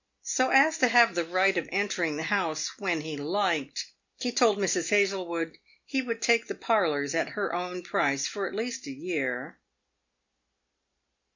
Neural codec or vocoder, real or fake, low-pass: none; real; 7.2 kHz